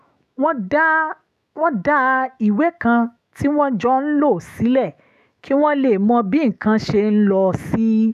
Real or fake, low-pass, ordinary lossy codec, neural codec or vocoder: fake; 14.4 kHz; none; autoencoder, 48 kHz, 128 numbers a frame, DAC-VAE, trained on Japanese speech